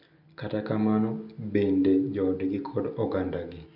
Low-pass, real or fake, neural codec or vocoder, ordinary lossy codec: 5.4 kHz; real; none; none